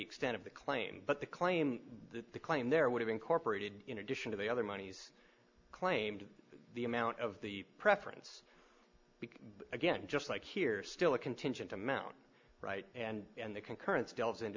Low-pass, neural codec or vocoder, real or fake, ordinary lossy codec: 7.2 kHz; none; real; MP3, 64 kbps